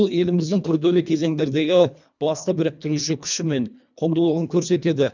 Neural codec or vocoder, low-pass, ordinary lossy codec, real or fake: codec, 24 kHz, 1.5 kbps, HILCodec; 7.2 kHz; none; fake